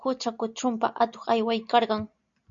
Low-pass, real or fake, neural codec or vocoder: 7.2 kHz; real; none